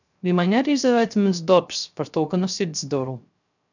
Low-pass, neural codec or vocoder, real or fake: 7.2 kHz; codec, 16 kHz, 0.3 kbps, FocalCodec; fake